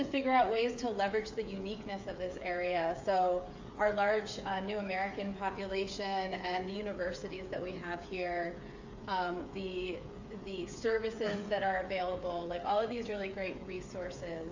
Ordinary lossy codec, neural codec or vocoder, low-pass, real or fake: AAC, 48 kbps; codec, 16 kHz, 8 kbps, FreqCodec, smaller model; 7.2 kHz; fake